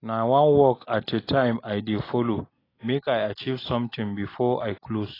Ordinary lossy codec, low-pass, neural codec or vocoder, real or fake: AAC, 24 kbps; 5.4 kHz; none; real